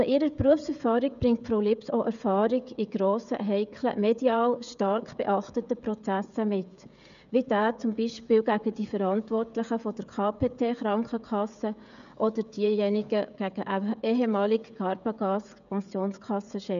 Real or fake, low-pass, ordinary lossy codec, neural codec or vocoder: fake; 7.2 kHz; none; codec, 16 kHz, 16 kbps, FreqCodec, smaller model